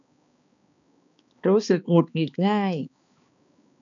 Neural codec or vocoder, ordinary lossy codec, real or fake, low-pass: codec, 16 kHz, 2 kbps, X-Codec, HuBERT features, trained on balanced general audio; MP3, 96 kbps; fake; 7.2 kHz